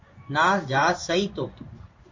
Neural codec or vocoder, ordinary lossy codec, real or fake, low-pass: codec, 16 kHz in and 24 kHz out, 1 kbps, XY-Tokenizer; MP3, 48 kbps; fake; 7.2 kHz